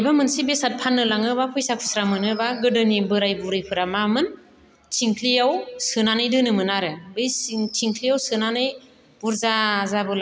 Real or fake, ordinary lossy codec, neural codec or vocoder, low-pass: real; none; none; none